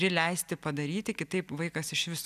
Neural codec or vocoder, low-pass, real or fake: none; 14.4 kHz; real